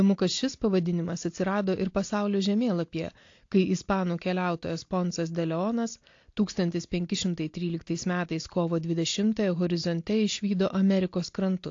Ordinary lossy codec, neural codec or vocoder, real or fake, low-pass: AAC, 48 kbps; none; real; 7.2 kHz